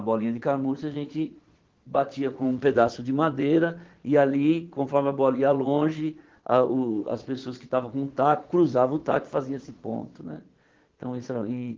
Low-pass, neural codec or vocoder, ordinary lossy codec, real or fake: 7.2 kHz; vocoder, 22.05 kHz, 80 mel bands, Vocos; Opus, 16 kbps; fake